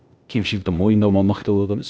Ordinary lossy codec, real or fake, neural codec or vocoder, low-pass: none; fake; codec, 16 kHz, 0.8 kbps, ZipCodec; none